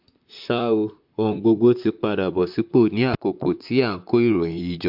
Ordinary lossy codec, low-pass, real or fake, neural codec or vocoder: MP3, 48 kbps; 5.4 kHz; fake; vocoder, 44.1 kHz, 128 mel bands, Pupu-Vocoder